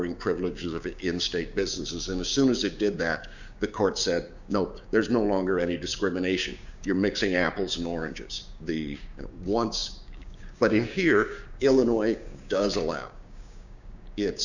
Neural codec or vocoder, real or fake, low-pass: codec, 16 kHz, 6 kbps, DAC; fake; 7.2 kHz